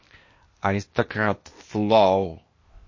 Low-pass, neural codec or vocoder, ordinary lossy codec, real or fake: 7.2 kHz; codec, 16 kHz, 0.8 kbps, ZipCodec; MP3, 32 kbps; fake